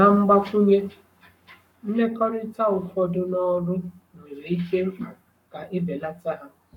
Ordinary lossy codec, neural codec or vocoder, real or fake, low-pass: none; codec, 44.1 kHz, 7.8 kbps, Pupu-Codec; fake; 19.8 kHz